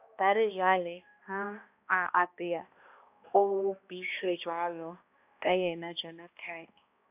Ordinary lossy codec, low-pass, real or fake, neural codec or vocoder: AAC, 32 kbps; 3.6 kHz; fake; codec, 16 kHz, 1 kbps, X-Codec, HuBERT features, trained on balanced general audio